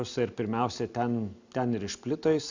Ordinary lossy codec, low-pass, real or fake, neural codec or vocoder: MP3, 64 kbps; 7.2 kHz; real; none